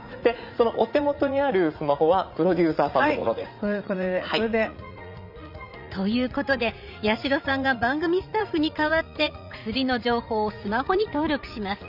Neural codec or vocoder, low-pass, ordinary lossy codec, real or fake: vocoder, 44.1 kHz, 80 mel bands, Vocos; 5.4 kHz; none; fake